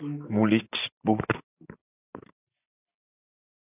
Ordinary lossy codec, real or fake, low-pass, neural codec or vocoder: AAC, 32 kbps; real; 3.6 kHz; none